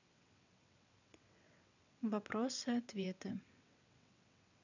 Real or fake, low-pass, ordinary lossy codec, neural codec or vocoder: fake; 7.2 kHz; none; vocoder, 22.05 kHz, 80 mel bands, WaveNeXt